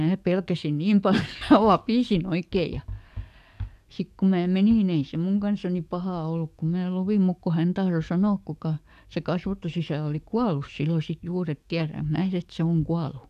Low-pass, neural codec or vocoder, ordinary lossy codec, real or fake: 14.4 kHz; codec, 44.1 kHz, 7.8 kbps, DAC; none; fake